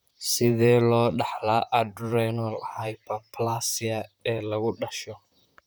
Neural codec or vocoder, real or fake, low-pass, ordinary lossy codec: vocoder, 44.1 kHz, 128 mel bands, Pupu-Vocoder; fake; none; none